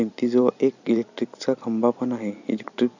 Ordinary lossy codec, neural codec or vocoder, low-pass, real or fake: none; vocoder, 44.1 kHz, 128 mel bands every 256 samples, BigVGAN v2; 7.2 kHz; fake